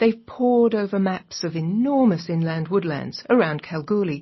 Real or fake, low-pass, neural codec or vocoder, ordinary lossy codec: real; 7.2 kHz; none; MP3, 24 kbps